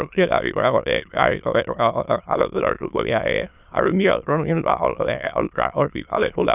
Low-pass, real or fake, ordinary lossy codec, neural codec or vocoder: 3.6 kHz; fake; none; autoencoder, 22.05 kHz, a latent of 192 numbers a frame, VITS, trained on many speakers